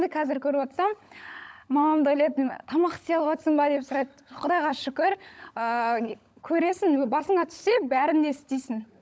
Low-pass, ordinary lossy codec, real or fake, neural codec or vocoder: none; none; fake; codec, 16 kHz, 16 kbps, FunCodec, trained on LibriTTS, 50 frames a second